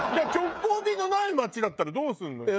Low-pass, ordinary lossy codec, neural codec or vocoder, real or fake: none; none; codec, 16 kHz, 16 kbps, FreqCodec, smaller model; fake